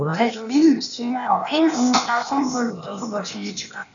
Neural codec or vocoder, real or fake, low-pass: codec, 16 kHz, 0.8 kbps, ZipCodec; fake; 7.2 kHz